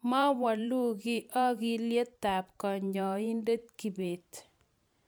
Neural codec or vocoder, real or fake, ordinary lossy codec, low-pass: vocoder, 44.1 kHz, 128 mel bands every 512 samples, BigVGAN v2; fake; none; none